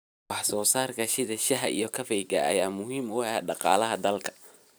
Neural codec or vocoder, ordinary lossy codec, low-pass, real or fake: none; none; none; real